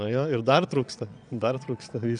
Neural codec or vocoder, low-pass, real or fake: vocoder, 22.05 kHz, 80 mel bands, Vocos; 9.9 kHz; fake